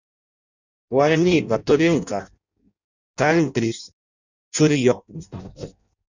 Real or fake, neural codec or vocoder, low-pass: fake; codec, 16 kHz in and 24 kHz out, 0.6 kbps, FireRedTTS-2 codec; 7.2 kHz